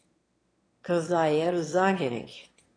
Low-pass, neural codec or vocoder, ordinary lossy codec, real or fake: 9.9 kHz; autoencoder, 22.05 kHz, a latent of 192 numbers a frame, VITS, trained on one speaker; AAC, 32 kbps; fake